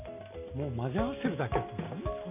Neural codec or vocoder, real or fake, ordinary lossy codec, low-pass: none; real; none; 3.6 kHz